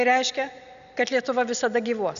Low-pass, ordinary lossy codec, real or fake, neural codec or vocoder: 7.2 kHz; AAC, 96 kbps; real; none